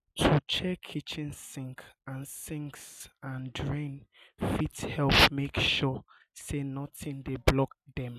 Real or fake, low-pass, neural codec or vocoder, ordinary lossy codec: real; 14.4 kHz; none; none